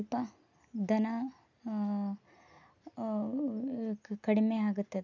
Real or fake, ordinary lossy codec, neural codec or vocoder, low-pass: real; none; none; 7.2 kHz